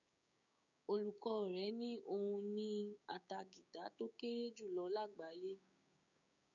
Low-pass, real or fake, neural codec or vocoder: 7.2 kHz; fake; codec, 16 kHz, 6 kbps, DAC